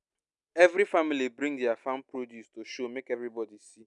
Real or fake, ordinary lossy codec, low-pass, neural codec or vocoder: real; none; 10.8 kHz; none